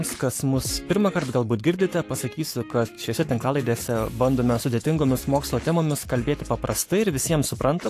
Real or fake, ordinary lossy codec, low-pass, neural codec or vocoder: fake; AAC, 64 kbps; 14.4 kHz; codec, 44.1 kHz, 7.8 kbps, Pupu-Codec